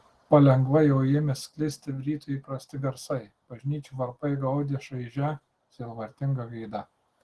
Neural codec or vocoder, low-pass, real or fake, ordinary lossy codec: none; 10.8 kHz; real; Opus, 16 kbps